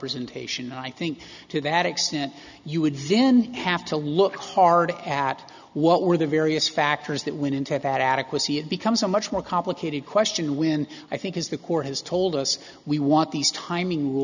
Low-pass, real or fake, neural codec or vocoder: 7.2 kHz; real; none